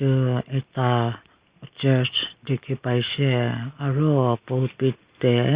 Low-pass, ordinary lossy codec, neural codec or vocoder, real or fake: 3.6 kHz; Opus, 64 kbps; none; real